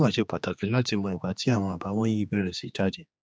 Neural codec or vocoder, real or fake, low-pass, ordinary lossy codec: codec, 16 kHz, 2 kbps, X-Codec, HuBERT features, trained on general audio; fake; none; none